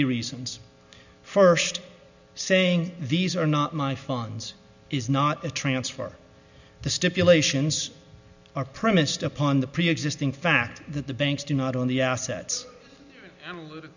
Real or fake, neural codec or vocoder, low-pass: real; none; 7.2 kHz